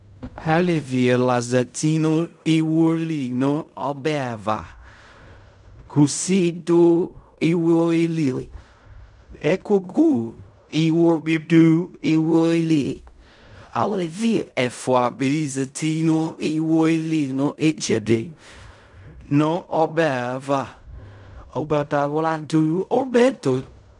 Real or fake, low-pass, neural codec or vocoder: fake; 10.8 kHz; codec, 16 kHz in and 24 kHz out, 0.4 kbps, LongCat-Audio-Codec, fine tuned four codebook decoder